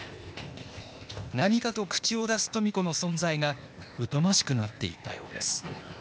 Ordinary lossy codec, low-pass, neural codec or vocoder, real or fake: none; none; codec, 16 kHz, 0.8 kbps, ZipCodec; fake